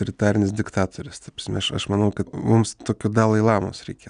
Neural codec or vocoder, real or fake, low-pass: none; real; 9.9 kHz